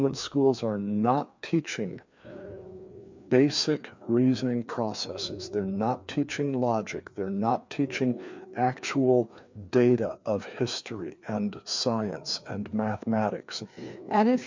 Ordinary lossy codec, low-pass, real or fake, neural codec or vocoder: MP3, 64 kbps; 7.2 kHz; fake; codec, 16 kHz, 2 kbps, FreqCodec, larger model